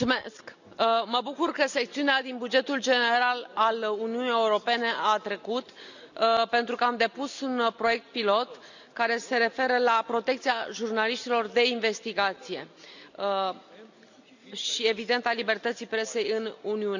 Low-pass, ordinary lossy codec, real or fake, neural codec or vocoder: 7.2 kHz; none; real; none